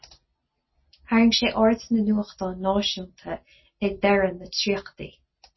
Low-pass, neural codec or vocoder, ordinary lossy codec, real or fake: 7.2 kHz; none; MP3, 24 kbps; real